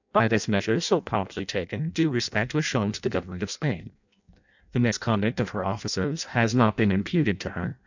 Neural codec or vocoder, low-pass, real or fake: codec, 16 kHz in and 24 kHz out, 0.6 kbps, FireRedTTS-2 codec; 7.2 kHz; fake